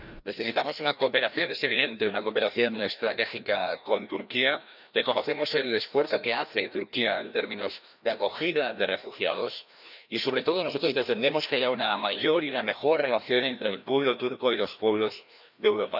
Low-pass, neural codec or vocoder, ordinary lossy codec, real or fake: 5.4 kHz; codec, 16 kHz, 1 kbps, FreqCodec, larger model; none; fake